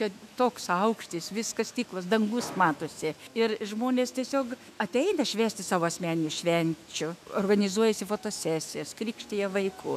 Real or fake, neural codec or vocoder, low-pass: fake; autoencoder, 48 kHz, 128 numbers a frame, DAC-VAE, trained on Japanese speech; 14.4 kHz